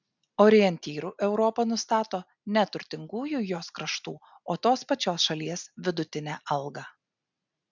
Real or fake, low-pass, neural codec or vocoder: real; 7.2 kHz; none